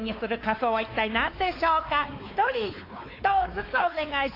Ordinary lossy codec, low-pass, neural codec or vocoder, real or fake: AAC, 32 kbps; 5.4 kHz; codec, 16 kHz, 4 kbps, X-Codec, WavLM features, trained on Multilingual LibriSpeech; fake